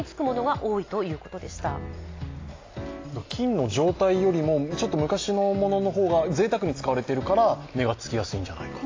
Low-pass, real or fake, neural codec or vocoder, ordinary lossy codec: 7.2 kHz; real; none; AAC, 32 kbps